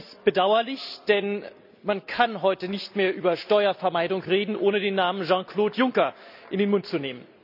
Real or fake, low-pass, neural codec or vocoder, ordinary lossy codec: real; 5.4 kHz; none; none